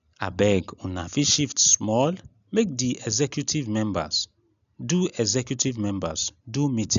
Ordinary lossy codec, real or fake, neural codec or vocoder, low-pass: none; real; none; 7.2 kHz